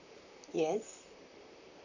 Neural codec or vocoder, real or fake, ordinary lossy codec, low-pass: vocoder, 44.1 kHz, 128 mel bands, Pupu-Vocoder; fake; none; 7.2 kHz